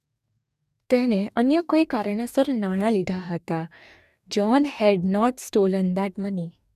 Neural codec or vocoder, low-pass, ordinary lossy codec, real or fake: codec, 44.1 kHz, 2.6 kbps, DAC; 14.4 kHz; none; fake